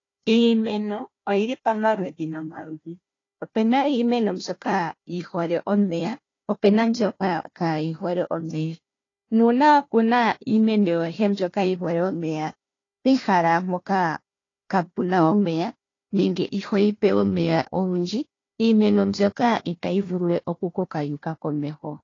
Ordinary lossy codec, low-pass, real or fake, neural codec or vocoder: AAC, 32 kbps; 7.2 kHz; fake; codec, 16 kHz, 1 kbps, FunCodec, trained on Chinese and English, 50 frames a second